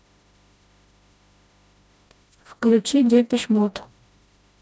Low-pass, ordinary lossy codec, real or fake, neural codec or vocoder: none; none; fake; codec, 16 kHz, 1 kbps, FreqCodec, smaller model